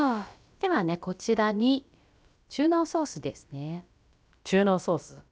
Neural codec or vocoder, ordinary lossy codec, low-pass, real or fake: codec, 16 kHz, about 1 kbps, DyCAST, with the encoder's durations; none; none; fake